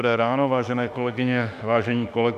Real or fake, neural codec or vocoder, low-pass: fake; autoencoder, 48 kHz, 32 numbers a frame, DAC-VAE, trained on Japanese speech; 14.4 kHz